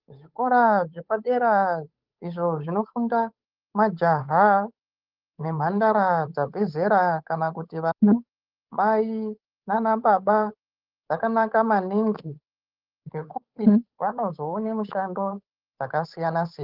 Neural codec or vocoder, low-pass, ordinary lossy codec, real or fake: codec, 16 kHz, 8 kbps, FunCodec, trained on Chinese and English, 25 frames a second; 5.4 kHz; Opus, 24 kbps; fake